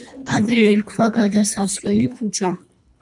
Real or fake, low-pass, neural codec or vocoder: fake; 10.8 kHz; codec, 24 kHz, 1.5 kbps, HILCodec